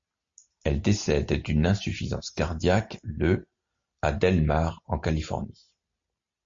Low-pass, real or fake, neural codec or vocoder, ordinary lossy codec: 7.2 kHz; real; none; MP3, 48 kbps